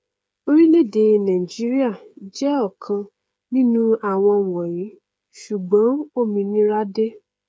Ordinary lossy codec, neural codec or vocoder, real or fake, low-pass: none; codec, 16 kHz, 8 kbps, FreqCodec, smaller model; fake; none